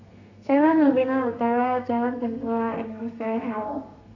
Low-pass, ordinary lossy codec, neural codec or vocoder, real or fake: 7.2 kHz; none; codec, 32 kHz, 1.9 kbps, SNAC; fake